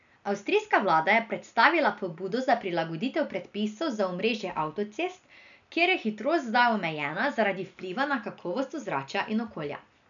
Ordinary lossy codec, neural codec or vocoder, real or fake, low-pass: none; none; real; 7.2 kHz